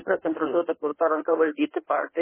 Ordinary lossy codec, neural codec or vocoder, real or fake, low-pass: MP3, 16 kbps; codec, 16 kHz in and 24 kHz out, 1.1 kbps, FireRedTTS-2 codec; fake; 3.6 kHz